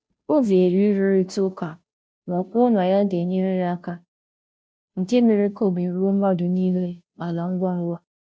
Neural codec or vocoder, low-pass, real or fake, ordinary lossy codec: codec, 16 kHz, 0.5 kbps, FunCodec, trained on Chinese and English, 25 frames a second; none; fake; none